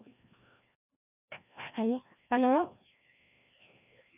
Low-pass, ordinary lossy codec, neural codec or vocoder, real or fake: 3.6 kHz; none; codec, 16 kHz, 1 kbps, FreqCodec, larger model; fake